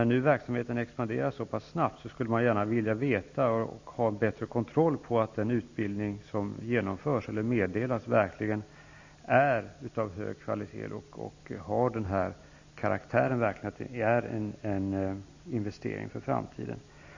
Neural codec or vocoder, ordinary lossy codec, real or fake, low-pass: none; AAC, 48 kbps; real; 7.2 kHz